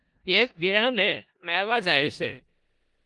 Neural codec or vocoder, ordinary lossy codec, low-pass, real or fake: codec, 16 kHz in and 24 kHz out, 0.4 kbps, LongCat-Audio-Codec, four codebook decoder; Opus, 24 kbps; 10.8 kHz; fake